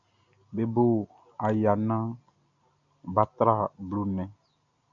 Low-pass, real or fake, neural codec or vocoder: 7.2 kHz; real; none